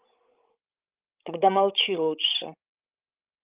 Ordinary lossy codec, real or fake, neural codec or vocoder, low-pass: Opus, 24 kbps; fake; codec, 16 kHz, 16 kbps, FreqCodec, larger model; 3.6 kHz